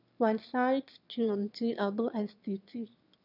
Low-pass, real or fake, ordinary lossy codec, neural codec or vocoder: 5.4 kHz; fake; none; autoencoder, 22.05 kHz, a latent of 192 numbers a frame, VITS, trained on one speaker